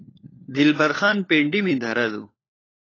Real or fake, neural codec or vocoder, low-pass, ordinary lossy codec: fake; codec, 16 kHz, 4 kbps, FunCodec, trained on LibriTTS, 50 frames a second; 7.2 kHz; AAC, 32 kbps